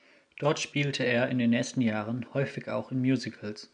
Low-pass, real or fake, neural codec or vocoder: 9.9 kHz; real; none